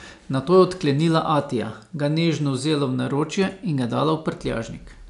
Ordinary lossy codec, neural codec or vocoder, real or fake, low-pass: none; none; real; 10.8 kHz